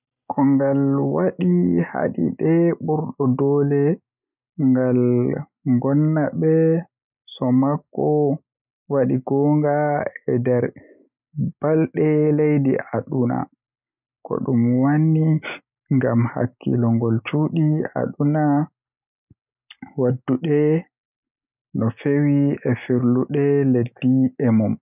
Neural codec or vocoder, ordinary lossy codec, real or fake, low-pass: none; none; real; 3.6 kHz